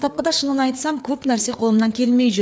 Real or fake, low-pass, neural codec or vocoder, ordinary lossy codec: fake; none; codec, 16 kHz, 4 kbps, FreqCodec, larger model; none